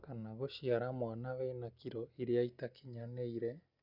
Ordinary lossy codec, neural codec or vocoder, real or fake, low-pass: Opus, 64 kbps; none; real; 5.4 kHz